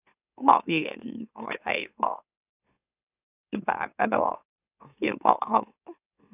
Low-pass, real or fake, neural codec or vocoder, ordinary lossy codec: 3.6 kHz; fake; autoencoder, 44.1 kHz, a latent of 192 numbers a frame, MeloTTS; none